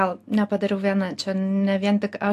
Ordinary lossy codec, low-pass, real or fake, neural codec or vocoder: AAC, 64 kbps; 14.4 kHz; real; none